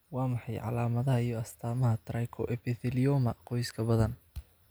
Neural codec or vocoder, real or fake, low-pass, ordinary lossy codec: none; real; none; none